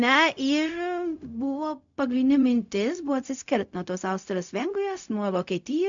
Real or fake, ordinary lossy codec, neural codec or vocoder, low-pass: fake; MP3, 64 kbps; codec, 16 kHz, 0.4 kbps, LongCat-Audio-Codec; 7.2 kHz